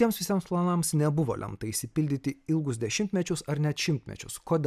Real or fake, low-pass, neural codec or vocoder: real; 14.4 kHz; none